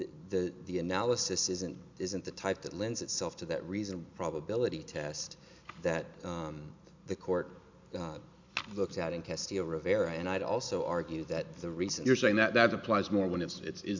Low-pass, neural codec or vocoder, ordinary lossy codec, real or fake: 7.2 kHz; none; MP3, 64 kbps; real